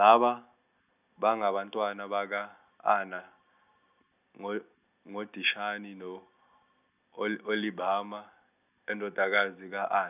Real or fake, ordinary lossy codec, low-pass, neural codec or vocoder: real; none; 3.6 kHz; none